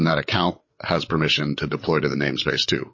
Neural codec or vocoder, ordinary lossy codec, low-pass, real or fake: codec, 16 kHz, 8 kbps, FreqCodec, larger model; MP3, 32 kbps; 7.2 kHz; fake